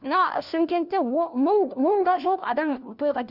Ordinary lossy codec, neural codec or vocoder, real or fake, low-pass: none; codec, 16 kHz, 1 kbps, FunCodec, trained on LibriTTS, 50 frames a second; fake; 5.4 kHz